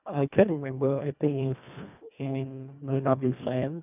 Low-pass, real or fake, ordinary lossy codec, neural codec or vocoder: 3.6 kHz; fake; none; codec, 24 kHz, 1.5 kbps, HILCodec